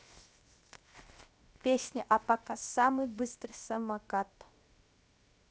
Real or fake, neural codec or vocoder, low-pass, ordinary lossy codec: fake; codec, 16 kHz, 0.7 kbps, FocalCodec; none; none